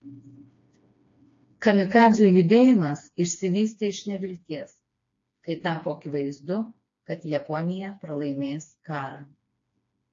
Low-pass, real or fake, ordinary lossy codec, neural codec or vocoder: 7.2 kHz; fake; AAC, 48 kbps; codec, 16 kHz, 2 kbps, FreqCodec, smaller model